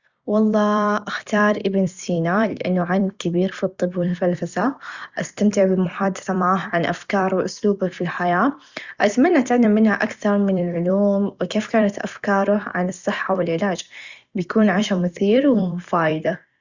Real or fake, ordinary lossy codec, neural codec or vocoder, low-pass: fake; Opus, 64 kbps; vocoder, 44.1 kHz, 128 mel bands every 256 samples, BigVGAN v2; 7.2 kHz